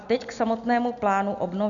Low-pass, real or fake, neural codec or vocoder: 7.2 kHz; real; none